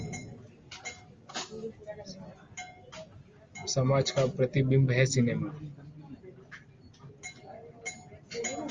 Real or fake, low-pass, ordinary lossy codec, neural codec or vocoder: real; 7.2 kHz; Opus, 32 kbps; none